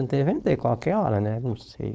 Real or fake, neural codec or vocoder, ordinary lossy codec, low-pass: fake; codec, 16 kHz, 4.8 kbps, FACodec; none; none